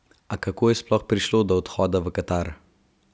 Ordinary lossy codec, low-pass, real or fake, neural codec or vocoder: none; none; real; none